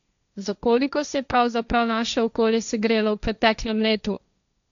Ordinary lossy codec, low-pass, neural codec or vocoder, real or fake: none; 7.2 kHz; codec, 16 kHz, 1.1 kbps, Voila-Tokenizer; fake